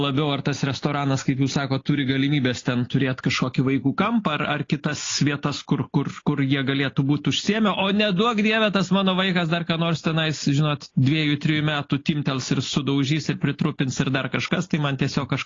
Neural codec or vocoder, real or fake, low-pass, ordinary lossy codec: none; real; 7.2 kHz; AAC, 32 kbps